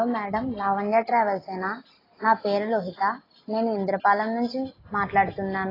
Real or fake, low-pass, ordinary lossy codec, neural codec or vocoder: real; 5.4 kHz; AAC, 24 kbps; none